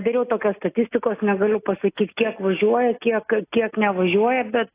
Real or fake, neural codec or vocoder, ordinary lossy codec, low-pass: real; none; AAC, 24 kbps; 3.6 kHz